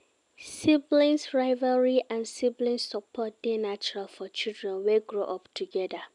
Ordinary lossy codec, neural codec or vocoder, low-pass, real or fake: none; none; 10.8 kHz; real